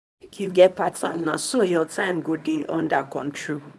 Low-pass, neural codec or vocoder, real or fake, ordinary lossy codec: none; codec, 24 kHz, 0.9 kbps, WavTokenizer, small release; fake; none